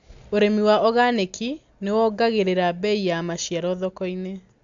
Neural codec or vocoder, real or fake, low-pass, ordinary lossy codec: none; real; 7.2 kHz; AAC, 64 kbps